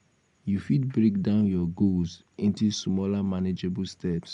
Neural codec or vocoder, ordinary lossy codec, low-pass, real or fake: none; none; 10.8 kHz; real